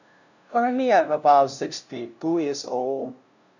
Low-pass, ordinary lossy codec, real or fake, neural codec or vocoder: 7.2 kHz; none; fake; codec, 16 kHz, 0.5 kbps, FunCodec, trained on LibriTTS, 25 frames a second